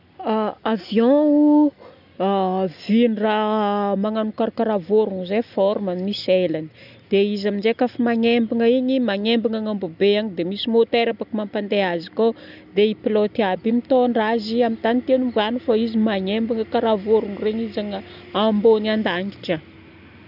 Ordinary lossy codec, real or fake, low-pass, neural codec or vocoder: none; real; 5.4 kHz; none